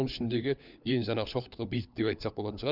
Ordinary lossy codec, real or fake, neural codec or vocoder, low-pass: none; fake; codec, 16 kHz, 4 kbps, FunCodec, trained on LibriTTS, 50 frames a second; 5.4 kHz